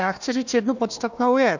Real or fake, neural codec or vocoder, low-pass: fake; codec, 16 kHz, 1 kbps, FunCodec, trained on Chinese and English, 50 frames a second; 7.2 kHz